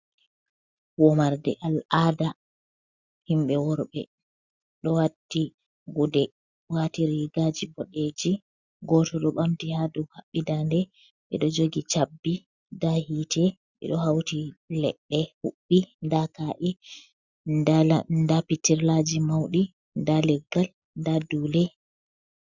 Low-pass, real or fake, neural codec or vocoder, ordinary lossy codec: 7.2 kHz; real; none; Opus, 64 kbps